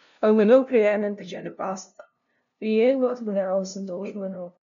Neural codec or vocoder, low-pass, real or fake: codec, 16 kHz, 0.5 kbps, FunCodec, trained on LibriTTS, 25 frames a second; 7.2 kHz; fake